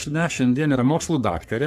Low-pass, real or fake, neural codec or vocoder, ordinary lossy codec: 14.4 kHz; fake; codec, 44.1 kHz, 3.4 kbps, Pupu-Codec; AAC, 96 kbps